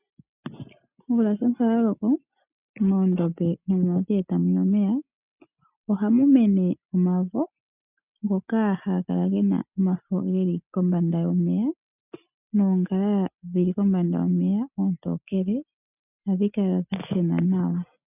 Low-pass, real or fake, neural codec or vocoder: 3.6 kHz; real; none